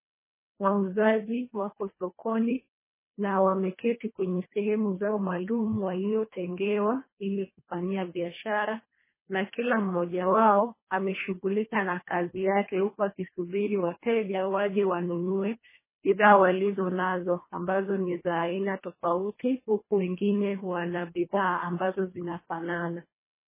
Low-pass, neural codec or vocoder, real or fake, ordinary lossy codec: 3.6 kHz; codec, 24 kHz, 1.5 kbps, HILCodec; fake; MP3, 16 kbps